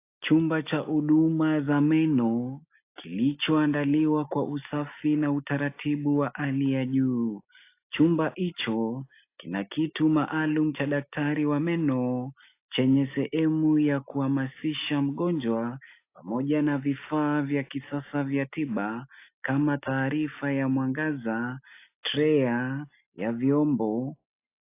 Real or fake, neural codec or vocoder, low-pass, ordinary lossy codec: real; none; 3.6 kHz; AAC, 24 kbps